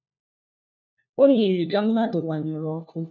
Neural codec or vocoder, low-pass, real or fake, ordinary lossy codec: codec, 16 kHz, 1 kbps, FunCodec, trained on LibriTTS, 50 frames a second; 7.2 kHz; fake; none